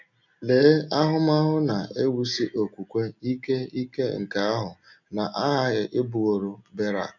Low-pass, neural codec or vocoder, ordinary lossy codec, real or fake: 7.2 kHz; none; none; real